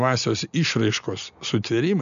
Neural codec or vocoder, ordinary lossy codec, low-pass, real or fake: none; MP3, 96 kbps; 7.2 kHz; real